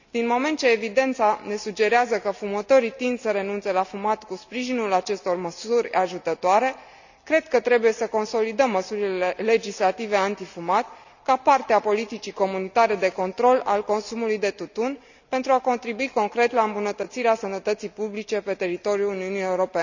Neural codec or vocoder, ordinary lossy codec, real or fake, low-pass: none; none; real; 7.2 kHz